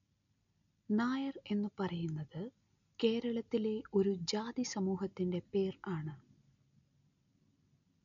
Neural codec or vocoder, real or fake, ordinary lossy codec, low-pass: none; real; none; 7.2 kHz